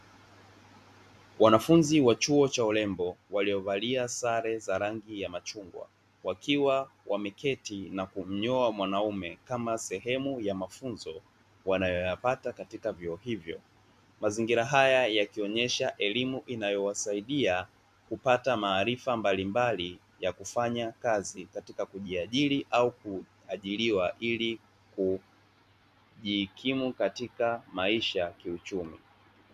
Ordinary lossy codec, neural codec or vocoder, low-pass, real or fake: MP3, 96 kbps; none; 14.4 kHz; real